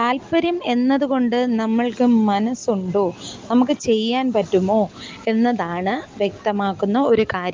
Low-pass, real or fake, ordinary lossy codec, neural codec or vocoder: 7.2 kHz; real; Opus, 32 kbps; none